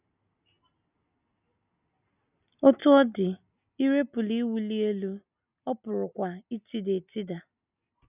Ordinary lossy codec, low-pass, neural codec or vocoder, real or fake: none; 3.6 kHz; none; real